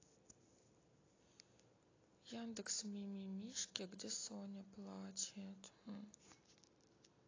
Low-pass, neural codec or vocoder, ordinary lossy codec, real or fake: 7.2 kHz; none; AAC, 32 kbps; real